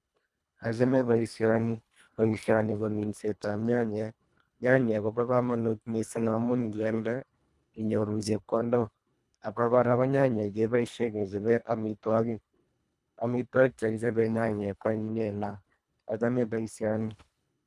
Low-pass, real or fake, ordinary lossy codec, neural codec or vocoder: none; fake; none; codec, 24 kHz, 1.5 kbps, HILCodec